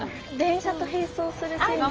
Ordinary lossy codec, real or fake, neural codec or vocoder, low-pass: Opus, 24 kbps; real; none; 7.2 kHz